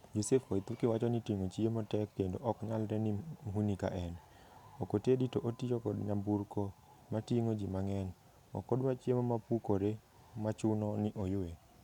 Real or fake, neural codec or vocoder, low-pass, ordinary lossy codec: real; none; 19.8 kHz; none